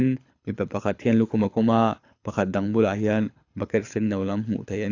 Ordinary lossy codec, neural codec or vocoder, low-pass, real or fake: AAC, 48 kbps; codec, 24 kHz, 6 kbps, HILCodec; 7.2 kHz; fake